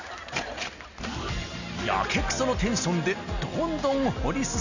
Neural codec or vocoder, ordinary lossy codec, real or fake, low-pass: none; none; real; 7.2 kHz